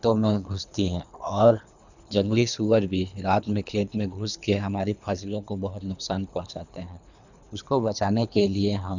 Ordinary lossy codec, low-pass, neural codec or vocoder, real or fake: none; 7.2 kHz; codec, 24 kHz, 3 kbps, HILCodec; fake